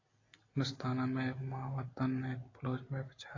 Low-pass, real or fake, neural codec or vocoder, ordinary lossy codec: 7.2 kHz; real; none; AAC, 32 kbps